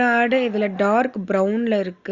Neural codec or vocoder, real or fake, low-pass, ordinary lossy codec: none; real; 7.2 kHz; Opus, 64 kbps